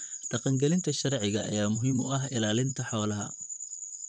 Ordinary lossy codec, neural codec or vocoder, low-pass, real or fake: none; vocoder, 44.1 kHz, 128 mel bands, Pupu-Vocoder; 9.9 kHz; fake